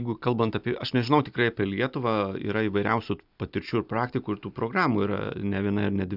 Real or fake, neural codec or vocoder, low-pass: real; none; 5.4 kHz